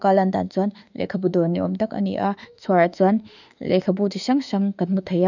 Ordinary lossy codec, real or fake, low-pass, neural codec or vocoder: none; fake; 7.2 kHz; autoencoder, 48 kHz, 32 numbers a frame, DAC-VAE, trained on Japanese speech